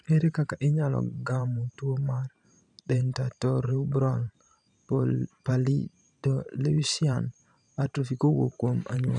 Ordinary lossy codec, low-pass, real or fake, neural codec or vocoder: none; 10.8 kHz; fake; vocoder, 44.1 kHz, 128 mel bands every 512 samples, BigVGAN v2